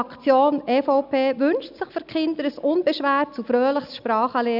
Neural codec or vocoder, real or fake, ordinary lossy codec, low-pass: none; real; none; 5.4 kHz